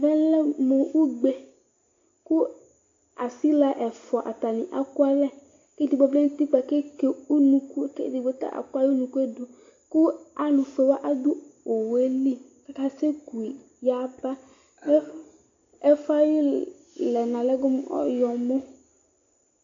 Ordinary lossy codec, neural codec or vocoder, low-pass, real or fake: AAC, 48 kbps; none; 7.2 kHz; real